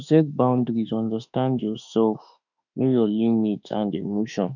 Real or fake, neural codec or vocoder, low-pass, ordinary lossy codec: fake; autoencoder, 48 kHz, 32 numbers a frame, DAC-VAE, trained on Japanese speech; 7.2 kHz; none